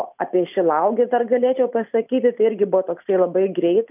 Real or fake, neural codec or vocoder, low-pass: real; none; 3.6 kHz